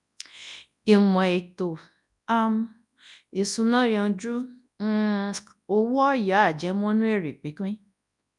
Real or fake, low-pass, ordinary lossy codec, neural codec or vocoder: fake; 10.8 kHz; none; codec, 24 kHz, 0.9 kbps, WavTokenizer, large speech release